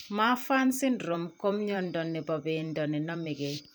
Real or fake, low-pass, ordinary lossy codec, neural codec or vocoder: fake; none; none; vocoder, 44.1 kHz, 128 mel bands every 512 samples, BigVGAN v2